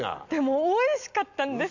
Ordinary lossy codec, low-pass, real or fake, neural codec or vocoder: none; 7.2 kHz; real; none